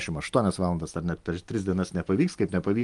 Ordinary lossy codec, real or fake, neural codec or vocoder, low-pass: Opus, 32 kbps; real; none; 10.8 kHz